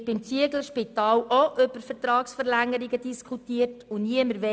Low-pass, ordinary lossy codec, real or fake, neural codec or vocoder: none; none; real; none